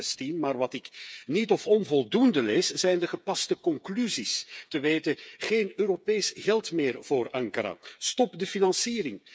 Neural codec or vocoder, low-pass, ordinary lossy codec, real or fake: codec, 16 kHz, 8 kbps, FreqCodec, smaller model; none; none; fake